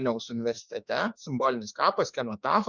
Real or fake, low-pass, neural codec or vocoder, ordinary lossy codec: fake; 7.2 kHz; autoencoder, 48 kHz, 32 numbers a frame, DAC-VAE, trained on Japanese speech; Opus, 64 kbps